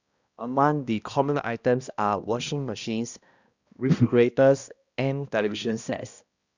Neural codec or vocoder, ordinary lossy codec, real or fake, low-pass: codec, 16 kHz, 1 kbps, X-Codec, HuBERT features, trained on balanced general audio; Opus, 64 kbps; fake; 7.2 kHz